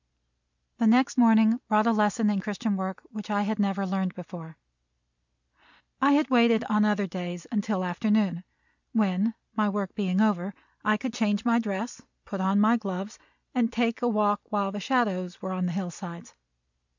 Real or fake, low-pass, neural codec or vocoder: real; 7.2 kHz; none